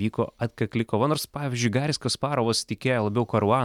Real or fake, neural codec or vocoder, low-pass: real; none; 19.8 kHz